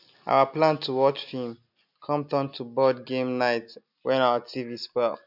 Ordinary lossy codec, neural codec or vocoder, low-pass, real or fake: none; none; 5.4 kHz; real